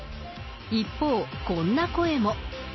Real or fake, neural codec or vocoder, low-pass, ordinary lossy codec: real; none; 7.2 kHz; MP3, 24 kbps